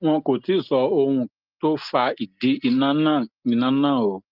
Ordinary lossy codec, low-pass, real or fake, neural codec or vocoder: Opus, 32 kbps; 5.4 kHz; real; none